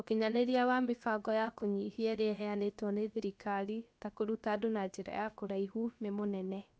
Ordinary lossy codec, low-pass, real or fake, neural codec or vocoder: none; none; fake; codec, 16 kHz, about 1 kbps, DyCAST, with the encoder's durations